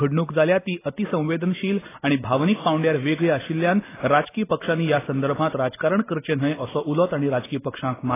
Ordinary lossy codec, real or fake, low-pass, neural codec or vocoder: AAC, 16 kbps; real; 3.6 kHz; none